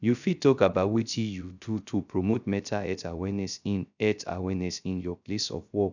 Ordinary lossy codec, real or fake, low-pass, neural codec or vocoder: none; fake; 7.2 kHz; codec, 16 kHz, 0.3 kbps, FocalCodec